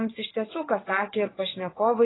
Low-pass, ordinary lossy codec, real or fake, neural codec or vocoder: 7.2 kHz; AAC, 16 kbps; fake; vocoder, 22.05 kHz, 80 mel bands, WaveNeXt